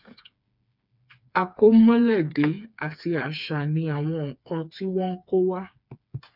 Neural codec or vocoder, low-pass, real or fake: codec, 16 kHz, 4 kbps, FreqCodec, smaller model; 5.4 kHz; fake